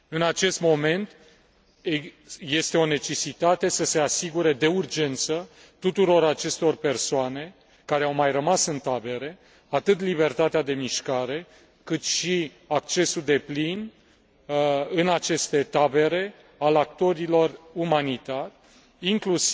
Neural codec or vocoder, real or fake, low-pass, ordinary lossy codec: none; real; none; none